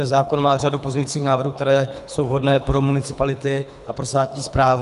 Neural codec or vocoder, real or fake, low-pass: codec, 24 kHz, 3 kbps, HILCodec; fake; 10.8 kHz